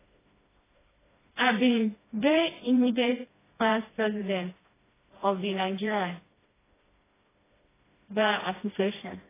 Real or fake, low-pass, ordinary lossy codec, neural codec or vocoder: fake; 3.6 kHz; AAC, 16 kbps; codec, 16 kHz, 1 kbps, FreqCodec, smaller model